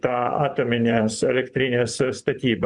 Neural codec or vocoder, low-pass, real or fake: vocoder, 24 kHz, 100 mel bands, Vocos; 10.8 kHz; fake